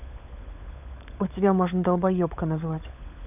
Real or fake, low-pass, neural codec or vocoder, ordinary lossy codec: fake; 3.6 kHz; codec, 16 kHz, 16 kbps, FunCodec, trained on LibriTTS, 50 frames a second; AAC, 32 kbps